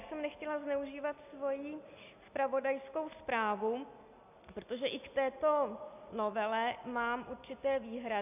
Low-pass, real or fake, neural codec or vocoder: 3.6 kHz; real; none